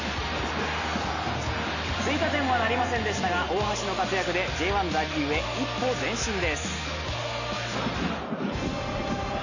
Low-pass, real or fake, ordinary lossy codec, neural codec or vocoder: 7.2 kHz; real; none; none